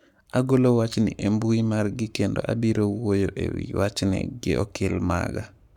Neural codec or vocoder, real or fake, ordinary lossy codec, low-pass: codec, 44.1 kHz, 7.8 kbps, Pupu-Codec; fake; none; 19.8 kHz